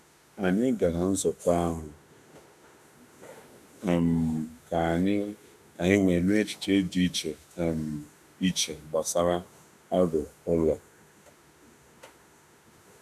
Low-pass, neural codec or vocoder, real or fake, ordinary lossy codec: 14.4 kHz; autoencoder, 48 kHz, 32 numbers a frame, DAC-VAE, trained on Japanese speech; fake; none